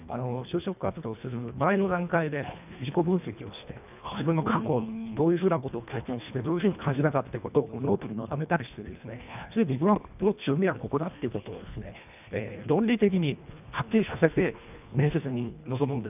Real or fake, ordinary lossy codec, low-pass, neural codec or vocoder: fake; none; 3.6 kHz; codec, 24 kHz, 1.5 kbps, HILCodec